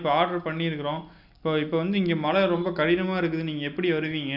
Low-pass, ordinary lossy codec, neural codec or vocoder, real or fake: 5.4 kHz; none; none; real